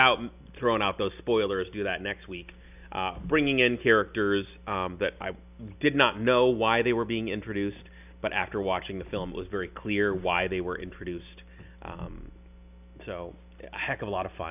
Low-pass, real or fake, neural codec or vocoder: 3.6 kHz; real; none